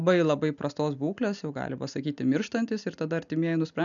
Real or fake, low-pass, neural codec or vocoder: real; 7.2 kHz; none